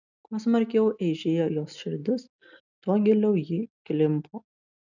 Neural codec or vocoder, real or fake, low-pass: none; real; 7.2 kHz